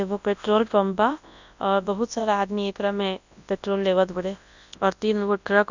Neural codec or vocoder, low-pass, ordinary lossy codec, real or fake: codec, 24 kHz, 0.9 kbps, WavTokenizer, large speech release; 7.2 kHz; none; fake